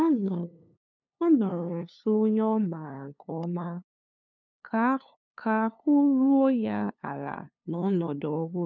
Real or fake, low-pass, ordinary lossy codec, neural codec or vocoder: fake; 7.2 kHz; none; codec, 16 kHz, 2 kbps, FunCodec, trained on LibriTTS, 25 frames a second